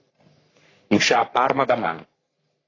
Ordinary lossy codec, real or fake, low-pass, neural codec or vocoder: AAC, 32 kbps; fake; 7.2 kHz; codec, 44.1 kHz, 3.4 kbps, Pupu-Codec